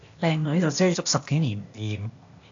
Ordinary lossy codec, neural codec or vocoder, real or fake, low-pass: AAC, 64 kbps; codec, 16 kHz, 0.8 kbps, ZipCodec; fake; 7.2 kHz